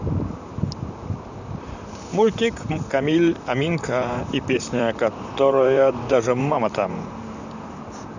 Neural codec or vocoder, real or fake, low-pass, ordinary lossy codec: vocoder, 44.1 kHz, 128 mel bands, Pupu-Vocoder; fake; 7.2 kHz; none